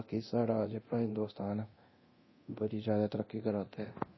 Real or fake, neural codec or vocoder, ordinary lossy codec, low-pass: fake; codec, 24 kHz, 0.9 kbps, DualCodec; MP3, 24 kbps; 7.2 kHz